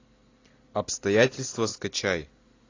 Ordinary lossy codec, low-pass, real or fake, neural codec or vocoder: AAC, 32 kbps; 7.2 kHz; real; none